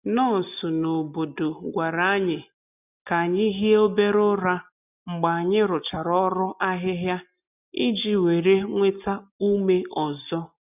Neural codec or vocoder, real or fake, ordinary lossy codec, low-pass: none; real; none; 3.6 kHz